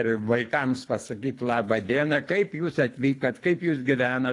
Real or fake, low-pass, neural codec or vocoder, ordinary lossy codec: fake; 10.8 kHz; codec, 24 kHz, 3 kbps, HILCodec; AAC, 48 kbps